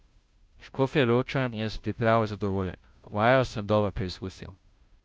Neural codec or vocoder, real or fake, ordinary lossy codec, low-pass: codec, 16 kHz, 0.5 kbps, FunCodec, trained on Chinese and English, 25 frames a second; fake; none; none